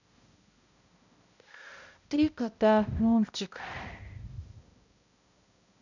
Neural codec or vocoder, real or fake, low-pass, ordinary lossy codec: codec, 16 kHz, 0.5 kbps, X-Codec, HuBERT features, trained on balanced general audio; fake; 7.2 kHz; none